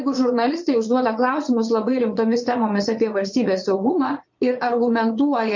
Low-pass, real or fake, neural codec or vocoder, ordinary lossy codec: 7.2 kHz; fake; vocoder, 22.05 kHz, 80 mel bands, Vocos; MP3, 48 kbps